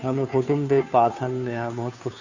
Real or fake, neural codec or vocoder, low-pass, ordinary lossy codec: fake; codec, 16 kHz, 2 kbps, FunCodec, trained on Chinese and English, 25 frames a second; 7.2 kHz; none